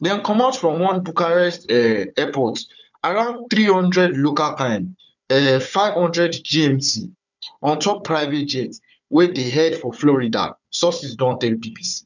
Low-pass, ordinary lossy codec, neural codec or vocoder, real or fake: 7.2 kHz; none; codec, 16 kHz, 4 kbps, FunCodec, trained on Chinese and English, 50 frames a second; fake